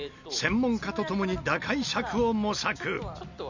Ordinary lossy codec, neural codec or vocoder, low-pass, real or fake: none; none; 7.2 kHz; real